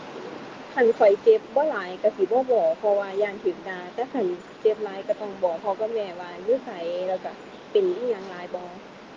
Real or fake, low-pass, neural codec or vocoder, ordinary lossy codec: real; 7.2 kHz; none; Opus, 24 kbps